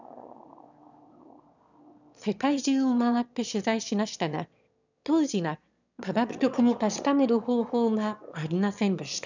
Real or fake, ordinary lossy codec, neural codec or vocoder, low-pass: fake; none; autoencoder, 22.05 kHz, a latent of 192 numbers a frame, VITS, trained on one speaker; 7.2 kHz